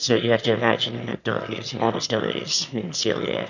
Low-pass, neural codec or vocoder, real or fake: 7.2 kHz; autoencoder, 22.05 kHz, a latent of 192 numbers a frame, VITS, trained on one speaker; fake